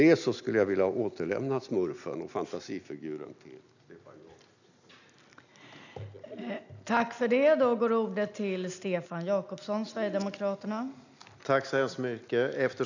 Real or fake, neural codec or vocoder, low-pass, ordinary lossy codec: real; none; 7.2 kHz; none